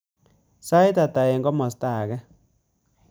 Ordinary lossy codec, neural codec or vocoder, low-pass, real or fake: none; none; none; real